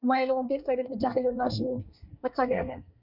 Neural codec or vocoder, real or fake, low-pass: codec, 24 kHz, 1 kbps, SNAC; fake; 5.4 kHz